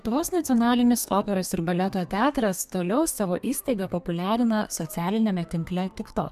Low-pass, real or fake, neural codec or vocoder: 14.4 kHz; fake; codec, 32 kHz, 1.9 kbps, SNAC